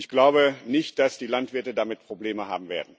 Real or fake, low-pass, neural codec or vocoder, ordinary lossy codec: real; none; none; none